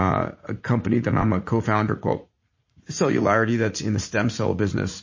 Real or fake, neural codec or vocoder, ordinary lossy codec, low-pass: fake; vocoder, 44.1 kHz, 80 mel bands, Vocos; MP3, 32 kbps; 7.2 kHz